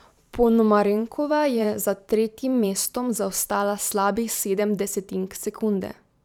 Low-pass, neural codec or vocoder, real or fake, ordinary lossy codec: 19.8 kHz; vocoder, 44.1 kHz, 128 mel bands every 512 samples, BigVGAN v2; fake; none